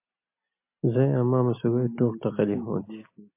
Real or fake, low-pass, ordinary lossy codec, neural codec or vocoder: real; 3.6 kHz; MP3, 24 kbps; none